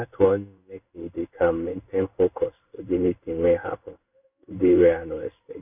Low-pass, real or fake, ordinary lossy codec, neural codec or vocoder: 3.6 kHz; fake; none; codec, 16 kHz in and 24 kHz out, 1 kbps, XY-Tokenizer